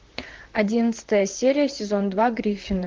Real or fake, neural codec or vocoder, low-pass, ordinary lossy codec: fake; vocoder, 44.1 kHz, 128 mel bands, Pupu-Vocoder; 7.2 kHz; Opus, 16 kbps